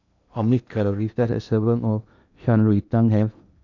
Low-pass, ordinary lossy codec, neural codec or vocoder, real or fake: 7.2 kHz; none; codec, 16 kHz in and 24 kHz out, 0.8 kbps, FocalCodec, streaming, 65536 codes; fake